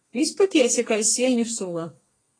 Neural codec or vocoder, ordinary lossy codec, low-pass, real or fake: codec, 32 kHz, 1.9 kbps, SNAC; AAC, 32 kbps; 9.9 kHz; fake